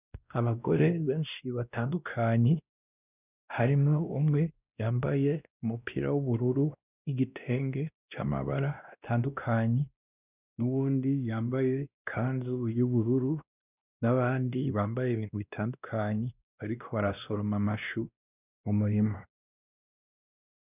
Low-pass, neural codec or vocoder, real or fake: 3.6 kHz; codec, 16 kHz, 1 kbps, X-Codec, WavLM features, trained on Multilingual LibriSpeech; fake